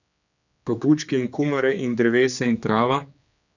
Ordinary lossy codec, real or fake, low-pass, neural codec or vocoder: none; fake; 7.2 kHz; codec, 16 kHz, 2 kbps, X-Codec, HuBERT features, trained on general audio